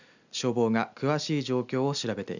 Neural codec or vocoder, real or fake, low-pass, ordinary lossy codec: none; real; 7.2 kHz; none